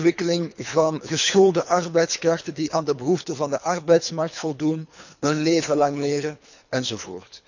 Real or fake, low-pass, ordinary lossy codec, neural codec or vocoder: fake; 7.2 kHz; none; codec, 24 kHz, 3 kbps, HILCodec